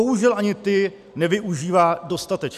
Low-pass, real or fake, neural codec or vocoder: 14.4 kHz; real; none